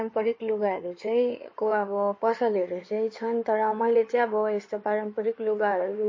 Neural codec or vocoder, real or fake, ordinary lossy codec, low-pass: codec, 16 kHz in and 24 kHz out, 2.2 kbps, FireRedTTS-2 codec; fake; MP3, 32 kbps; 7.2 kHz